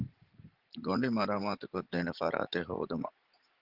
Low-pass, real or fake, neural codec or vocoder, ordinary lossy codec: 5.4 kHz; fake; vocoder, 44.1 kHz, 80 mel bands, Vocos; Opus, 16 kbps